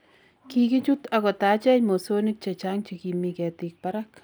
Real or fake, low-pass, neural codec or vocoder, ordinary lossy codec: real; none; none; none